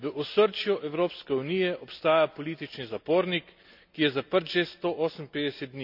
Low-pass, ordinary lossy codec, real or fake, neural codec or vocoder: 5.4 kHz; none; real; none